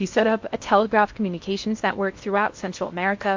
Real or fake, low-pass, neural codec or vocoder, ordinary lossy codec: fake; 7.2 kHz; codec, 16 kHz in and 24 kHz out, 0.8 kbps, FocalCodec, streaming, 65536 codes; MP3, 48 kbps